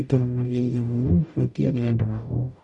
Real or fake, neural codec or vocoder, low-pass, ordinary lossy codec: fake; codec, 44.1 kHz, 0.9 kbps, DAC; 10.8 kHz; MP3, 96 kbps